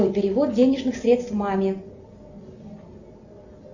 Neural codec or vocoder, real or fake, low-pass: none; real; 7.2 kHz